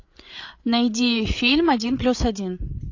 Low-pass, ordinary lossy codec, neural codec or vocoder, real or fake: 7.2 kHz; AAC, 48 kbps; codec, 16 kHz, 8 kbps, FreqCodec, larger model; fake